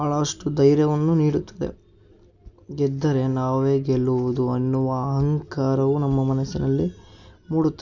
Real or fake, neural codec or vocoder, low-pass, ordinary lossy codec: real; none; 7.2 kHz; none